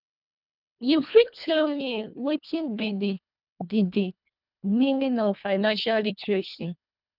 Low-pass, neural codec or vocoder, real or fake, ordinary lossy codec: 5.4 kHz; codec, 24 kHz, 1.5 kbps, HILCodec; fake; none